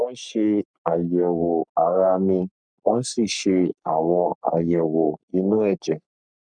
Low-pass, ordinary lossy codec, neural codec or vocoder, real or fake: 9.9 kHz; none; codec, 44.1 kHz, 3.4 kbps, Pupu-Codec; fake